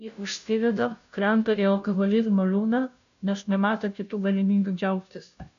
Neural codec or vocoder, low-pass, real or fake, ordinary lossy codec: codec, 16 kHz, 0.5 kbps, FunCodec, trained on Chinese and English, 25 frames a second; 7.2 kHz; fake; MP3, 96 kbps